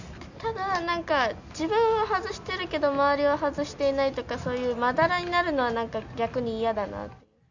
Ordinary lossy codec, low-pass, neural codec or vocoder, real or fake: none; 7.2 kHz; none; real